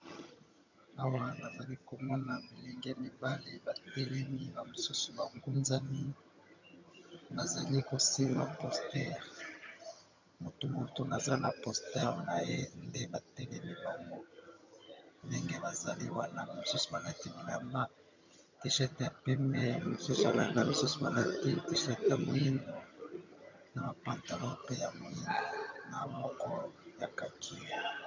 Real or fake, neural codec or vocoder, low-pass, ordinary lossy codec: fake; vocoder, 22.05 kHz, 80 mel bands, HiFi-GAN; 7.2 kHz; MP3, 64 kbps